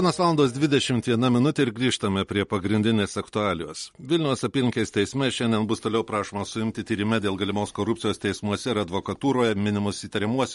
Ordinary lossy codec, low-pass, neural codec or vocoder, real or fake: MP3, 48 kbps; 19.8 kHz; none; real